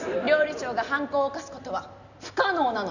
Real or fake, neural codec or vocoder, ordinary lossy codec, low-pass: real; none; none; 7.2 kHz